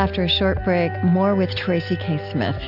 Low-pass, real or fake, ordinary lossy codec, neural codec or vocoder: 5.4 kHz; real; AAC, 48 kbps; none